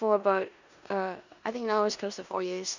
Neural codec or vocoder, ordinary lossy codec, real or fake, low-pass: codec, 16 kHz in and 24 kHz out, 0.9 kbps, LongCat-Audio-Codec, four codebook decoder; none; fake; 7.2 kHz